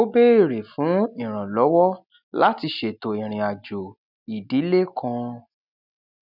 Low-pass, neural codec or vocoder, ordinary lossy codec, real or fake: 5.4 kHz; none; none; real